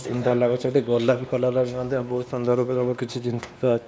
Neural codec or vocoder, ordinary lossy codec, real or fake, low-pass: codec, 16 kHz, 2 kbps, X-Codec, WavLM features, trained on Multilingual LibriSpeech; none; fake; none